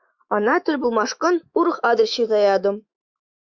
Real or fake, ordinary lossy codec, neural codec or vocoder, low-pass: fake; Opus, 64 kbps; autoencoder, 48 kHz, 128 numbers a frame, DAC-VAE, trained on Japanese speech; 7.2 kHz